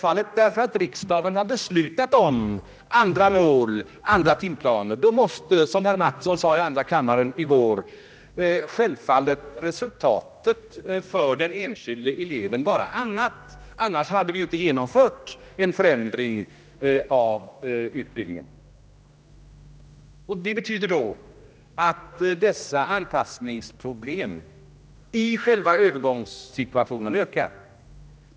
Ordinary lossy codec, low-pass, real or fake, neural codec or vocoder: none; none; fake; codec, 16 kHz, 1 kbps, X-Codec, HuBERT features, trained on general audio